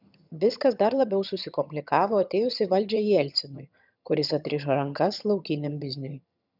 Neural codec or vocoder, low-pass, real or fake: vocoder, 22.05 kHz, 80 mel bands, HiFi-GAN; 5.4 kHz; fake